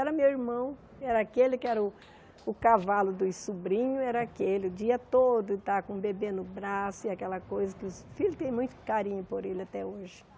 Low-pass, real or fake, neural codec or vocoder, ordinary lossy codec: none; real; none; none